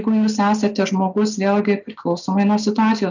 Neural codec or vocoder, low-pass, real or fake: none; 7.2 kHz; real